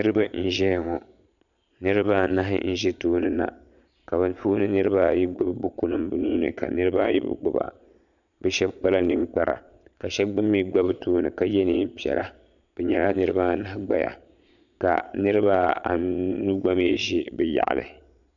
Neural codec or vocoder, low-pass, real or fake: vocoder, 22.05 kHz, 80 mel bands, Vocos; 7.2 kHz; fake